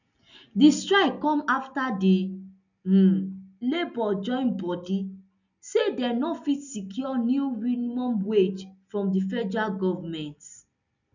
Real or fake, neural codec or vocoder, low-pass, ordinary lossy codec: real; none; 7.2 kHz; none